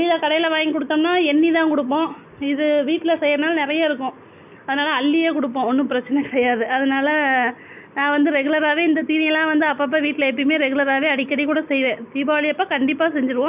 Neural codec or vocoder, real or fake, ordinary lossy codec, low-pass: none; real; none; 3.6 kHz